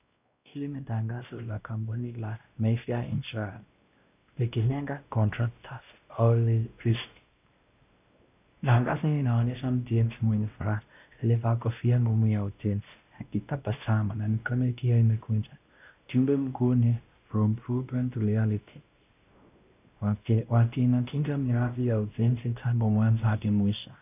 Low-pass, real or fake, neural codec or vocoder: 3.6 kHz; fake; codec, 16 kHz, 1 kbps, X-Codec, WavLM features, trained on Multilingual LibriSpeech